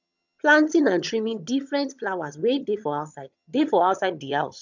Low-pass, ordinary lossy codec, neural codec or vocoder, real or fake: 7.2 kHz; none; vocoder, 22.05 kHz, 80 mel bands, HiFi-GAN; fake